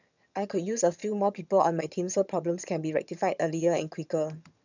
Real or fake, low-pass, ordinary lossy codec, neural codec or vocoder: fake; 7.2 kHz; none; vocoder, 22.05 kHz, 80 mel bands, HiFi-GAN